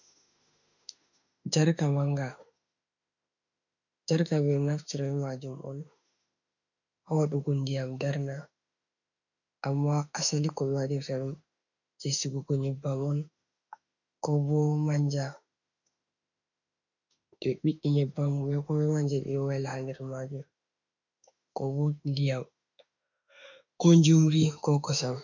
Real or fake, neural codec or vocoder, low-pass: fake; autoencoder, 48 kHz, 32 numbers a frame, DAC-VAE, trained on Japanese speech; 7.2 kHz